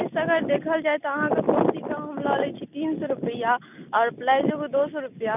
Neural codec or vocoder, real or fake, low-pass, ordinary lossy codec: none; real; 3.6 kHz; none